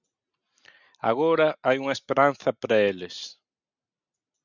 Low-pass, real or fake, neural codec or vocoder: 7.2 kHz; real; none